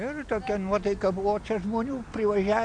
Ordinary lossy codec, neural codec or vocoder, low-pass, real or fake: MP3, 64 kbps; none; 9.9 kHz; real